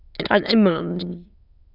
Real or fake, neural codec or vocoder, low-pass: fake; autoencoder, 22.05 kHz, a latent of 192 numbers a frame, VITS, trained on many speakers; 5.4 kHz